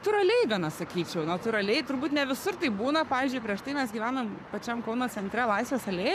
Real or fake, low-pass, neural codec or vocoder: fake; 14.4 kHz; codec, 44.1 kHz, 7.8 kbps, Pupu-Codec